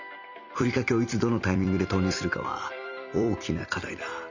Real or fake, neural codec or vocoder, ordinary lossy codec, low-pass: fake; vocoder, 44.1 kHz, 128 mel bands every 256 samples, BigVGAN v2; none; 7.2 kHz